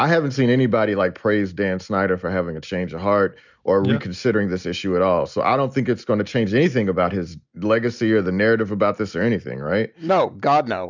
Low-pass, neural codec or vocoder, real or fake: 7.2 kHz; none; real